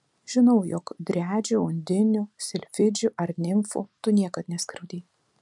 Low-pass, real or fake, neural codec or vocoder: 10.8 kHz; real; none